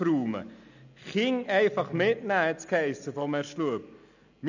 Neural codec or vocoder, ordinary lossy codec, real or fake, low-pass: none; none; real; 7.2 kHz